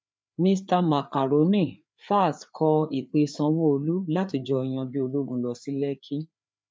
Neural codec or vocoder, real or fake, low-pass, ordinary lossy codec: codec, 16 kHz, 4 kbps, FreqCodec, larger model; fake; none; none